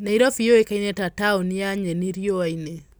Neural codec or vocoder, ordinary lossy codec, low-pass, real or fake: none; none; none; real